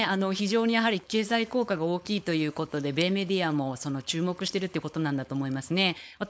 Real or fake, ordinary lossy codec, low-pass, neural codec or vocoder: fake; none; none; codec, 16 kHz, 4.8 kbps, FACodec